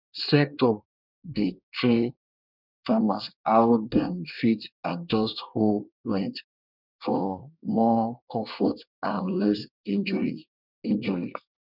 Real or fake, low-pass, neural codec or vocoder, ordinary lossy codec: fake; 5.4 kHz; codec, 24 kHz, 1 kbps, SNAC; none